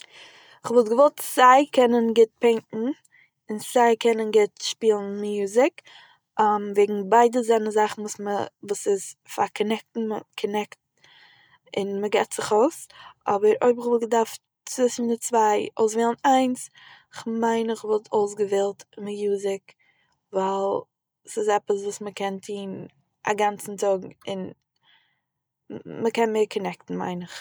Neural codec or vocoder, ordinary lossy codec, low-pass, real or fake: none; none; none; real